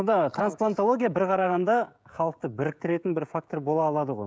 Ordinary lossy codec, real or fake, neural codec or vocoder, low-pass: none; fake; codec, 16 kHz, 16 kbps, FreqCodec, smaller model; none